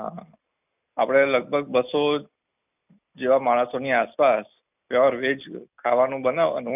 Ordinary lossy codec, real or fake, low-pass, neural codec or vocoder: none; real; 3.6 kHz; none